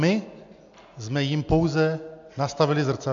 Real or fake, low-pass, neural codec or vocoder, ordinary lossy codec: real; 7.2 kHz; none; MP3, 64 kbps